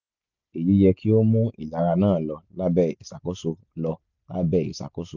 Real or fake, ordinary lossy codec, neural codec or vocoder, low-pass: real; none; none; 7.2 kHz